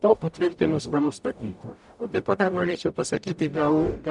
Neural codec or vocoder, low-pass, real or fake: codec, 44.1 kHz, 0.9 kbps, DAC; 10.8 kHz; fake